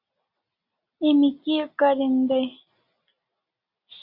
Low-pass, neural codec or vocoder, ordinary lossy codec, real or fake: 5.4 kHz; none; Opus, 64 kbps; real